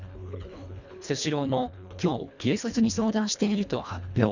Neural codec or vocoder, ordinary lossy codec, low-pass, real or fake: codec, 24 kHz, 1.5 kbps, HILCodec; none; 7.2 kHz; fake